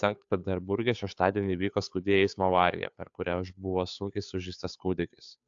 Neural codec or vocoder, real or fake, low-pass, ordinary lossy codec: codec, 16 kHz, 2 kbps, FunCodec, trained on Chinese and English, 25 frames a second; fake; 7.2 kHz; AAC, 64 kbps